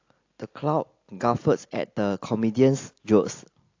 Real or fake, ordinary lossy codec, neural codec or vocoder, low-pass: real; AAC, 48 kbps; none; 7.2 kHz